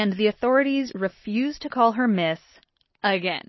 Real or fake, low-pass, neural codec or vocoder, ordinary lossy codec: real; 7.2 kHz; none; MP3, 24 kbps